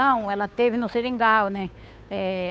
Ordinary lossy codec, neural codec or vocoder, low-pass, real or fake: none; codec, 16 kHz, 2 kbps, FunCodec, trained on Chinese and English, 25 frames a second; none; fake